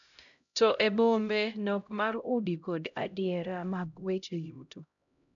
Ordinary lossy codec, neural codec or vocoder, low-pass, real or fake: none; codec, 16 kHz, 0.5 kbps, X-Codec, HuBERT features, trained on LibriSpeech; 7.2 kHz; fake